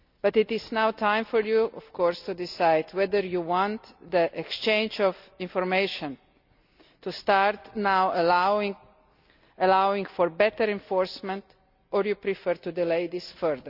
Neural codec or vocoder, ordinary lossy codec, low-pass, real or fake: none; none; 5.4 kHz; real